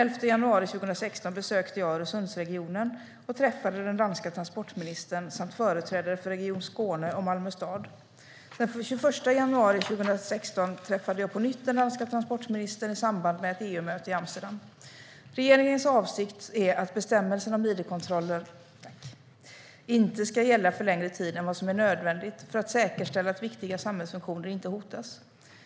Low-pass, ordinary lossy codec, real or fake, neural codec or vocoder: none; none; real; none